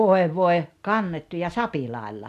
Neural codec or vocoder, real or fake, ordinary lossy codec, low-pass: none; real; none; 14.4 kHz